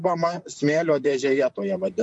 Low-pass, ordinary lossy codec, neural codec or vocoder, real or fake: 10.8 kHz; MP3, 48 kbps; vocoder, 44.1 kHz, 128 mel bands, Pupu-Vocoder; fake